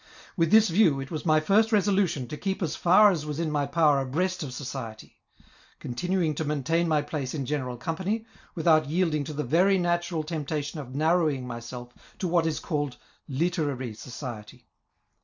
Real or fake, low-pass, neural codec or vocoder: real; 7.2 kHz; none